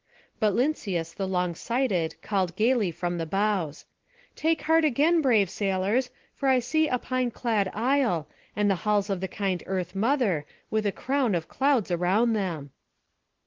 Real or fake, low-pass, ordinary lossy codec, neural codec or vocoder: real; 7.2 kHz; Opus, 16 kbps; none